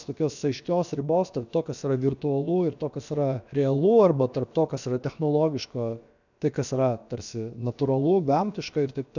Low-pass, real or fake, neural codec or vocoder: 7.2 kHz; fake; codec, 16 kHz, about 1 kbps, DyCAST, with the encoder's durations